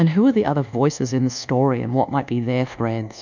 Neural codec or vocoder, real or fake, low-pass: codec, 24 kHz, 1.2 kbps, DualCodec; fake; 7.2 kHz